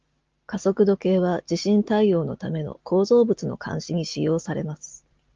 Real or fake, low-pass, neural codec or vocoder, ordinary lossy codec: real; 7.2 kHz; none; Opus, 32 kbps